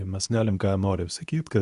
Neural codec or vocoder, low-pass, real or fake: codec, 24 kHz, 0.9 kbps, WavTokenizer, medium speech release version 2; 10.8 kHz; fake